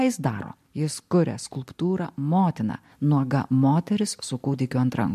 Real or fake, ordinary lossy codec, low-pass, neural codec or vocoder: real; MP3, 64 kbps; 14.4 kHz; none